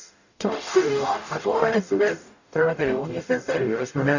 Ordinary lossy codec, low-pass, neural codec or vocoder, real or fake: none; 7.2 kHz; codec, 44.1 kHz, 0.9 kbps, DAC; fake